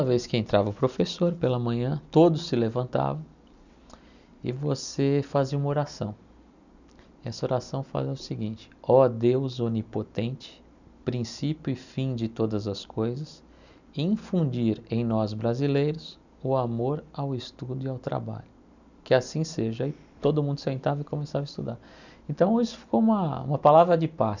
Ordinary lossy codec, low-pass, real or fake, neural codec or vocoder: none; 7.2 kHz; real; none